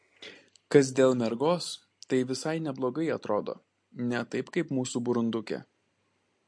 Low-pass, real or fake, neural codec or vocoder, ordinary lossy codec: 9.9 kHz; real; none; MP3, 48 kbps